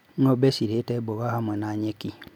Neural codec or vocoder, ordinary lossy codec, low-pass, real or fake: none; none; 19.8 kHz; real